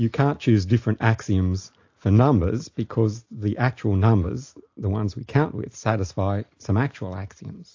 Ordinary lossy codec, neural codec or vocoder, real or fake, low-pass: AAC, 48 kbps; none; real; 7.2 kHz